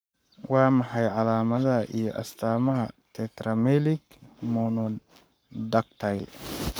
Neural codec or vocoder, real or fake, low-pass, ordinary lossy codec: codec, 44.1 kHz, 7.8 kbps, Pupu-Codec; fake; none; none